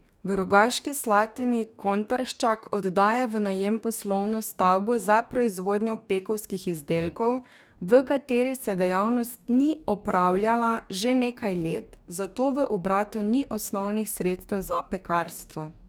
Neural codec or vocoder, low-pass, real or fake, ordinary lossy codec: codec, 44.1 kHz, 2.6 kbps, DAC; none; fake; none